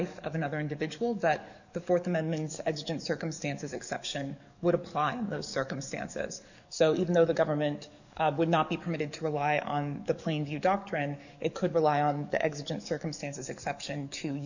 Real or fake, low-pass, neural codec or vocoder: fake; 7.2 kHz; codec, 44.1 kHz, 7.8 kbps, DAC